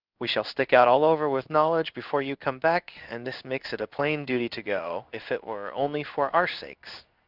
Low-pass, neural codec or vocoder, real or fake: 5.4 kHz; codec, 16 kHz in and 24 kHz out, 1 kbps, XY-Tokenizer; fake